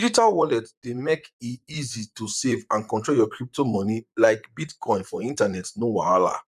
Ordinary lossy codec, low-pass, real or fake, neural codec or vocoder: none; 14.4 kHz; fake; vocoder, 44.1 kHz, 128 mel bands, Pupu-Vocoder